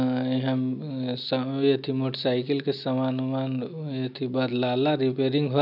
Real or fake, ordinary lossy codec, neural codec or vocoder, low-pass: real; none; none; 5.4 kHz